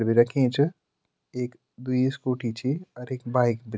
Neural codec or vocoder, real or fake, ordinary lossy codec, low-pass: none; real; none; none